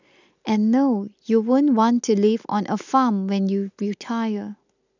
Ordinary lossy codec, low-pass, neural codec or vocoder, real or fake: none; 7.2 kHz; none; real